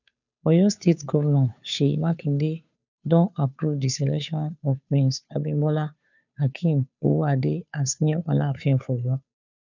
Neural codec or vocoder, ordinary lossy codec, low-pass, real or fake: codec, 16 kHz, 2 kbps, FunCodec, trained on Chinese and English, 25 frames a second; none; 7.2 kHz; fake